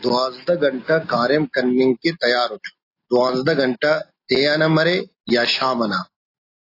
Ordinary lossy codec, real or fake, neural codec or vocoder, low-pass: AAC, 32 kbps; real; none; 5.4 kHz